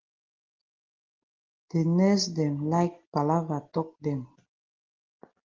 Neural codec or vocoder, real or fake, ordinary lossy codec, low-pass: none; real; Opus, 16 kbps; 7.2 kHz